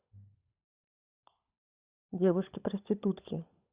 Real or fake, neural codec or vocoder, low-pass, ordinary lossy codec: fake; codec, 16 kHz, 16 kbps, FunCodec, trained on LibriTTS, 50 frames a second; 3.6 kHz; Opus, 64 kbps